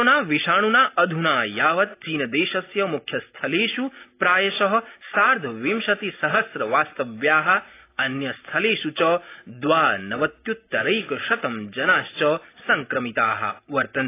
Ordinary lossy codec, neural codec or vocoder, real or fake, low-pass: AAC, 24 kbps; none; real; 3.6 kHz